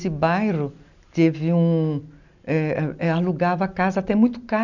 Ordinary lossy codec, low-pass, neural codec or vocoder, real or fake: none; 7.2 kHz; none; real